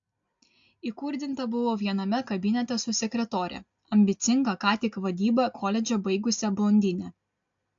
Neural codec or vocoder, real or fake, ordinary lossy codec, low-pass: none; real; AAC, 64 kbps; 7.2 kHz